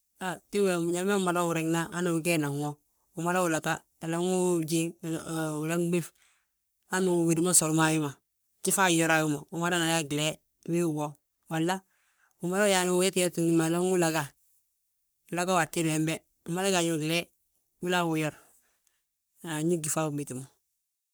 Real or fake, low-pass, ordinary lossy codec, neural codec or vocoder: fake; none; none; codec, 44.1 kHz, 3.4 kbps, Pupu-Codec